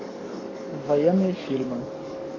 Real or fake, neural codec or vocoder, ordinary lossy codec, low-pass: fake; codec, 44.1 kHz, 7.8 kbps, DAC; AAC, 32 kbps; 7.2 kHz